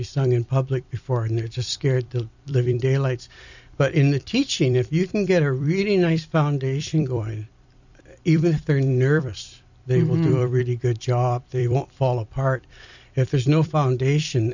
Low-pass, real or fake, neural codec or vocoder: 7.2 kHz; fake; vocoder, 44.1 kHz, 128 mel bands every 256 samples, BigVGAN v2